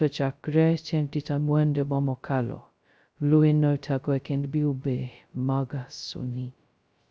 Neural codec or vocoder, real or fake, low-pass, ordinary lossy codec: codec, 16 kHz, 0.2 kbps, FocalCodec; fake; none; none